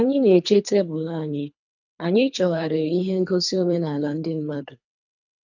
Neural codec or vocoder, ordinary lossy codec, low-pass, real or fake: codec, 24 kHz, 3 kbps, HILCodec; none; 7.2 kHz; fake